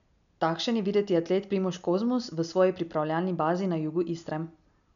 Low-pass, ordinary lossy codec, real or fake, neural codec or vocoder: 7.2 kHz; none; real; none